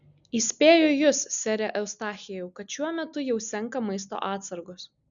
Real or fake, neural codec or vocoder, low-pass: real; none; 7.2 kHz